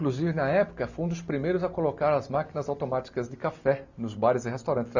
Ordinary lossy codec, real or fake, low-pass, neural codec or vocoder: none; real; 7.2 kHz; none